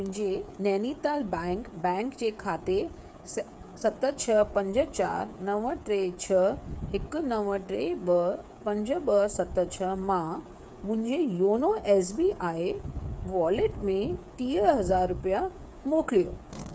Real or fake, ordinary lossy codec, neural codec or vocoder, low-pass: fake; none; codec, 16 kHz, 8 kbps, FreqCodec, smaller model; none